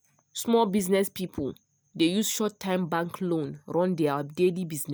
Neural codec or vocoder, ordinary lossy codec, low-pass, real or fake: none; none; none; real